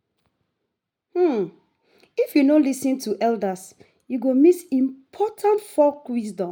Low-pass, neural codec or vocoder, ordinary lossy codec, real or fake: none; none; none; real